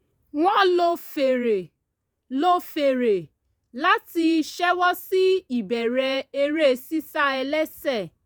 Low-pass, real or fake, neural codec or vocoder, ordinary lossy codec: none; fake; vocoder, 48 kHz, 128 mel bands, Vocos; none